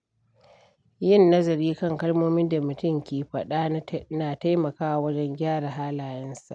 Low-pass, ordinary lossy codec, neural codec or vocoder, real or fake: 9.9 kHz; none; none; real